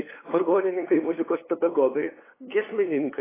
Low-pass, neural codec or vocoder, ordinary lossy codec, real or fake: 3.6 kHz; codec, 16 kHz, 8 kbps, FunCodec, trained on LibriTTS, 25 frames a second; AAC, 16 kbps; fake